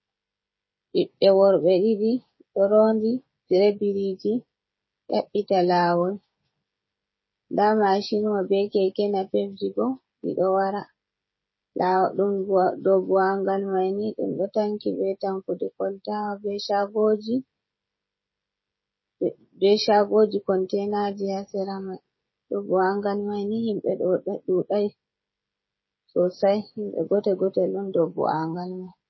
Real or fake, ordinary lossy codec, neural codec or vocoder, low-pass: fake; MP3, 24 kbps; codec, 16 kHz, 16 kbps, FreqCodec, smaller model; 7.2 kHz